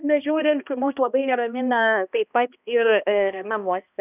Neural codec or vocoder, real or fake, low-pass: codec, 16 kHz, 1 kbps, X-Codec, HuBERT features, trained on balanced general audio; fake; 3.6 kHz